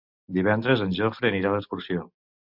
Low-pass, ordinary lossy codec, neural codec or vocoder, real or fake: 5.4 kHz; MP3, 48 kbps; none; real